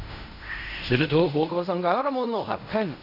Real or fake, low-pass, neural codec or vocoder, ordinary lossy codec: fake; 5.4 kHz; codec, 16 kHz in and 24 kHz out, 0.4 kbps, LongCat-Audio-Codec, fine tuned four codebook decoder; none